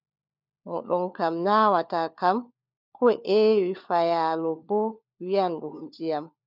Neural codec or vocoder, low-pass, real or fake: codec, 16 kHz, 4 kbps, FunCodec, trained on LibriTTS, 50 frames a second; 5.4 kHz; fake